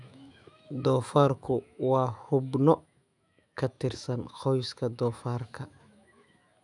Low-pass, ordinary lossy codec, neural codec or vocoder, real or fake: 10.8 kHz; none; autoencoder, 48 kHz, 128 numbers a frame, DAC-VAE, trained on Japanese speech; fake